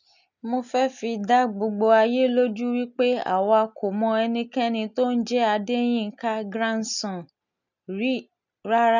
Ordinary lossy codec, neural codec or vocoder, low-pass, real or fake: none; none; 7.2 kHz; real